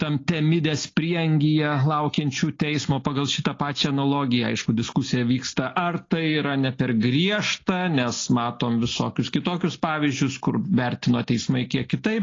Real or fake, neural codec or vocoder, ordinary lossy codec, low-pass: real; none; AAC, 32 kbps; 7.2 kHz